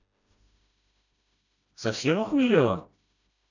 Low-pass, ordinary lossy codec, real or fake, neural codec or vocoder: 7.2 kHz; none; fake; codec, 16 kHz, 1 kbps, FreqCodec, smaller model